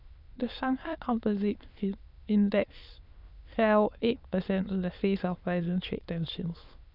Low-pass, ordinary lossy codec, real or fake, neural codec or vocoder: 5.4 kHz; none; fake; autoencoder, 22.05 kHz, a latent of 192 numbers a frame, VITS, trained on many speakers